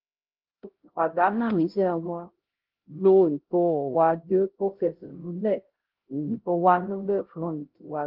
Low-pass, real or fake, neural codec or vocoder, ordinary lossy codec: 5.4 kHz; fake; codec, 16 kHz, 0.5 kbps, X-Codec, HuBERT features, trained on LibriSpeech; Opus, 16 kbps